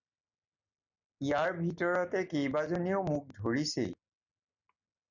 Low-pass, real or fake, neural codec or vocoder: 7.2 kHz; real; none